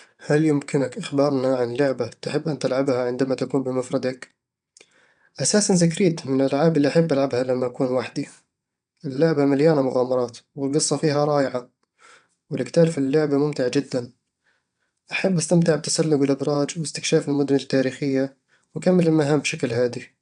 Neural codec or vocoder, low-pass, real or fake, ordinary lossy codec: vocoder, 22.05 kHz, 80 mel bands, WaveNeXt; 9.9 kHz; fake; none